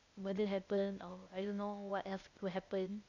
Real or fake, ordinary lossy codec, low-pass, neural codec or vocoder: fake; none; 7.2 kHz; codec, 16 kHz, 0.8 kbps, ZipCodec